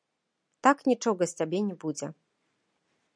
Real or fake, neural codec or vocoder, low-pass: real; none; 9.9 kHz